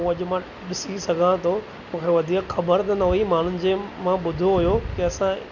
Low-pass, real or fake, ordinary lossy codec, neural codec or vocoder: 7.2 kHz; real; none; none